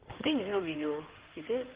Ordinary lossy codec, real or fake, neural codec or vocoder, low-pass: Opus, 16 kbps; fake; codec, 16 kHz in and 24 kHz out, 2.2 kbps, FireRedTTS-2 codec; 3.6 kHz